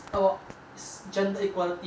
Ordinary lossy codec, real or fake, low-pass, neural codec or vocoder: none; real; none; none